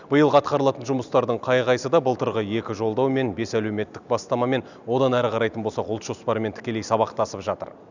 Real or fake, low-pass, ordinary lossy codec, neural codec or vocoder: real; 7.2 kHz; none; none